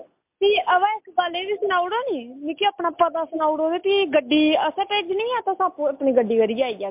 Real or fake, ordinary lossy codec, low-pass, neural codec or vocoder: real; MP3, 32 kbps; 3.6 kHz; none